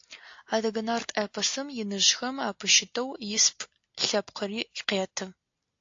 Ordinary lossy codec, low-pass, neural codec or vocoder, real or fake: AAC, 48 kbps; 7.2 kHz; none; real